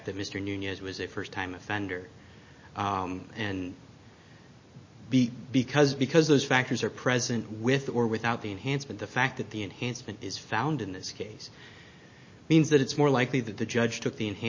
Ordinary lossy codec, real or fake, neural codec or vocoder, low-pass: MP3, 32 kbps; real; none; 7.2 kHz